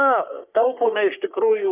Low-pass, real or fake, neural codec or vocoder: 3.6 kHz; fake; codec, 44.1 kHz, 3.4 kbps, Pupu-Codec